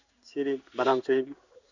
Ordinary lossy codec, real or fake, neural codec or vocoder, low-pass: none; fake; codec, 16 kHz in and 24 kHz out, 1 kbps, XY-Tokenizer; 7.2 kHz